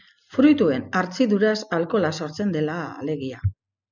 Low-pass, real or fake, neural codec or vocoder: 7.2 kHz; real; none